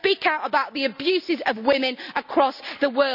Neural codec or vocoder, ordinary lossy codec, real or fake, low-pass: none; none; real; 5.4 kHz